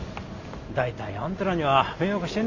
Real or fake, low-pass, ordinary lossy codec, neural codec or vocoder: real; 7.2 kHz; Opus, 64 kbps; none